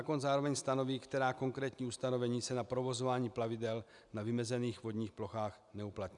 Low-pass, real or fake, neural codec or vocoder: 10.8 kHz; real; none